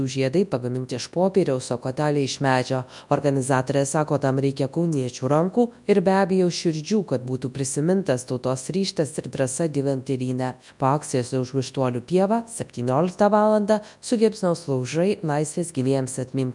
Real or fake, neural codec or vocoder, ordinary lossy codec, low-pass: fake; codec, 24 kHz, 0.9 kbps, WavTokenizer, large speech release; MP3, 96 kbps; 10.8 kHz